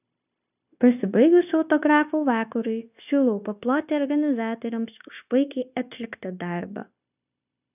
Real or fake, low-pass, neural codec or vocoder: fake; 3.6 kHz; codec, 16 kHz, 0.9 kbps, LongCat-Audio-Codec